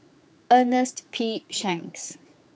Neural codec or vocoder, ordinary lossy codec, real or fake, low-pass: codec, 16 kHz, 4 kbps, X-Codec, HuBERT features, trained on general audio; none; fake; none